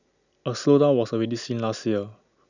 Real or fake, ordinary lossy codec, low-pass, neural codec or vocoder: real; none; 7.2 kHz; none